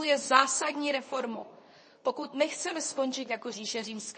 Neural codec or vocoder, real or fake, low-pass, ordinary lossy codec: codec, 24 kHz, 0.9 kbps, WavTokenizer, medium speech release version 2; fake; 10.8 kHz; MP3, 32 kbps